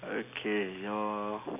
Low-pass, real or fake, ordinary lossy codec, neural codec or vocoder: 3.6 kHz; real; none; none